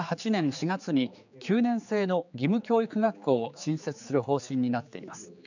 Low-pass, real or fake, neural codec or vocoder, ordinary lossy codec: 7.2 kHz; fake; codec, 16 kHz, 4 kbps, X-Codec, HuBERT features, trained on general audio; none